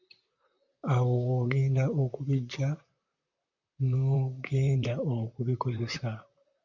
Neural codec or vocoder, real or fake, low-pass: vocoder, 44.1 kHz, 128 mel bands, Pupu-Vocoder; fake; 7.2 kHz